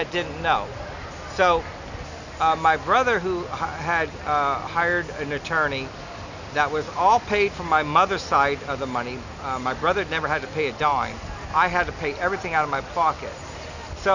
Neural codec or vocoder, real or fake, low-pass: none; real; 7.2 kHz